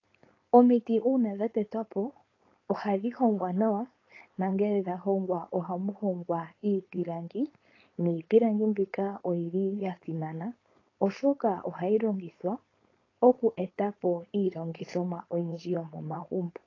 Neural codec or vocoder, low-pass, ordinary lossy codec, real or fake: codec, 16 kHz, 4.8 kbps, FACodec; 7.2 kHz; AAC, 32 kbps; fake